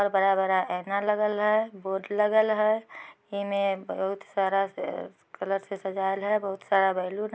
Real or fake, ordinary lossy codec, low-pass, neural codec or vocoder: real; none; none; none